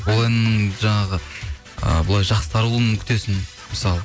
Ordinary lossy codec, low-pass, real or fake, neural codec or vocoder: none; none; real; none